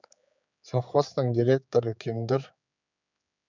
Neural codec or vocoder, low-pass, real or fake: codec, 16 kHz, 4 kbps, X-Codec, HuBERT features, trained on general audio; 7.2 kHz; fake